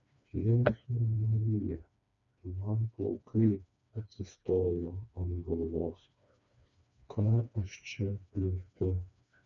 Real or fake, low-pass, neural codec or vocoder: fake; 7.2 kHz; codec, 16 kHz, 2 kbps, FreqCodec, smaller model